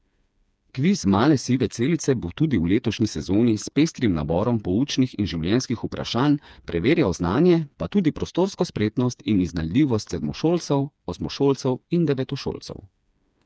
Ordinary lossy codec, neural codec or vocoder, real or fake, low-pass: none; codec, 16 kHz, 4 kbps, FreqCodec, smaller model; fake; none